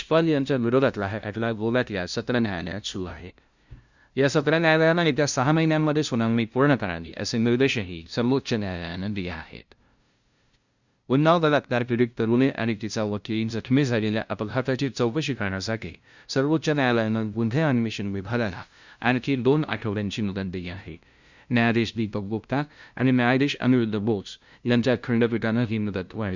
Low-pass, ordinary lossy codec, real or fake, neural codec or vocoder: 7.2 kHz; Opus, 64 kbps; fake; codec, 16 kHz, 0.5 kbps, FunCodec, trained on LibriTTS, 25 frames a second